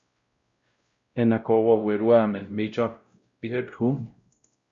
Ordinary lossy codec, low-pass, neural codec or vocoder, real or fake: Opus, 64 kbps; 7.2 kHz; codec, 16 kHz, 0.5 kbps, X-Codec, WavLM features, trained on Multilingual LibriSpeech; fake